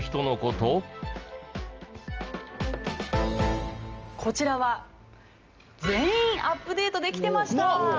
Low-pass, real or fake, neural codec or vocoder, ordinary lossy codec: 7.2 kHz; real; none; Opus, 24 kbps